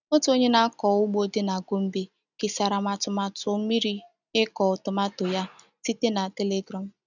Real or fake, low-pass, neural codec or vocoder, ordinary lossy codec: real; 7.2 kHz; none; none